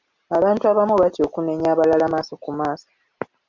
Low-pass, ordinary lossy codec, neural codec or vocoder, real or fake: 7.2 kHz; MP3, 64 kbps; none; real